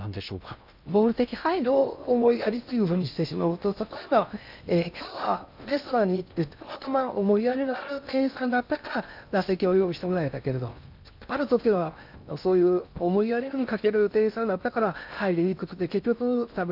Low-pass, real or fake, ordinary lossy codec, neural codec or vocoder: 5.4 kHz; fake; none; codec, 16 kHz in and 24 kHz out, 0.6 kbps, FocalCodec, streaming, 2048 codes